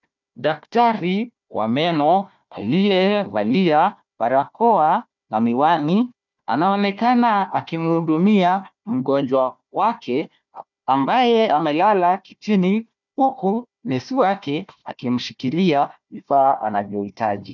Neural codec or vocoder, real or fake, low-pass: codec, 16 kHz, 1 kbps, FunCodec, trained on Chinese and English, 50 frames a second; fake; 7.2 kHz